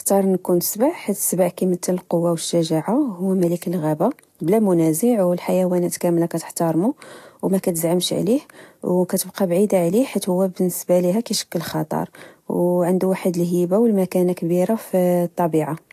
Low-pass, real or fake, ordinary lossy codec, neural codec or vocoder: 14.4 kHz; real; AAC, 64 kbps; none